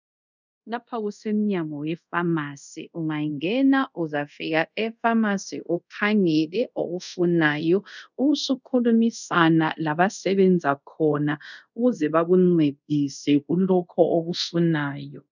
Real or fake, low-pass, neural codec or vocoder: fake; 7.2 kHz; codec, 24 kHz, 0.5 kbps, DualCodec